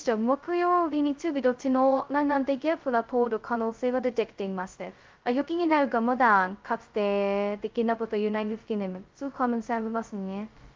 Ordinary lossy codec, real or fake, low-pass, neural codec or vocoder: Opus, 32 kbps; fake; 7.2 kHz; codec, 16 kHz, 0.2 kbps, FocalCodec